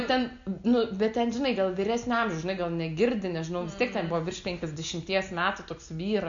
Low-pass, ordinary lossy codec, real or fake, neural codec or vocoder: 7.2 kHz; MP3, 64 kbps; real; none